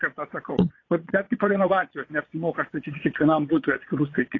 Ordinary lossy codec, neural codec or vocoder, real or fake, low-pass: AAC, 32 kbps; vocoder, 22.05 kHz, 80 mel bands, Vocos; fake; 7.2 kHz